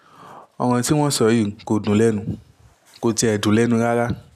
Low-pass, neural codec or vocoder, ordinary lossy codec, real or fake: 14.4 kHz; none; none; real